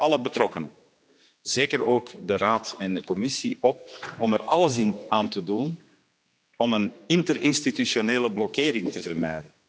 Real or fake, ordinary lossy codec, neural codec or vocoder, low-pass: fake; none; codec, 16 kHz, 2 kbps, X-Codec, HuBERT features, trained on general audio; none